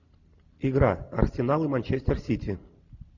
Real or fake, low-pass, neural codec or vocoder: real; 7.2 kHz; none